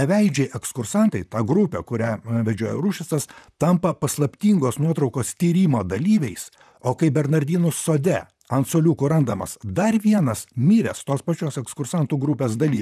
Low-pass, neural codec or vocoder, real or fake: 14.4 kHz; vocoder, 44.1 kHz, 128 mel bands, Pupu-Vocoder; fake